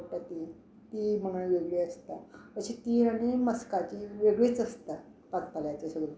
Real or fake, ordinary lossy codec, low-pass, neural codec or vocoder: real; none; none; none